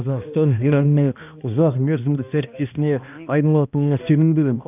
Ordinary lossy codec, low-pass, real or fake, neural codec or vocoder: none; 3.6 kHz; fake; codec, 16 kHz, 1 kbps, X-Codec, HuBERT features, trained on balanced general audio